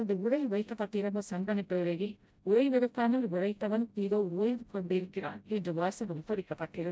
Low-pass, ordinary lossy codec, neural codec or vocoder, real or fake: none; none; codec, 16 kHz, 0.5 kbps, FreqCodec, smaller model; fake